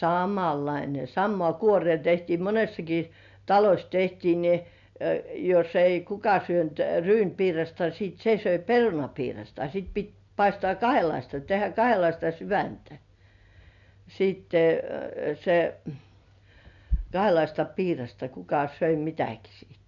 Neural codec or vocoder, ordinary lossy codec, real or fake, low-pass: none; none; real; 7.2 kHz